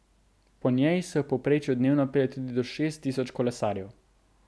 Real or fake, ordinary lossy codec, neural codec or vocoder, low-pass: real; none; none; none